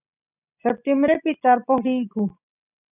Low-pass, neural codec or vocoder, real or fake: 3.6 kHz; none; real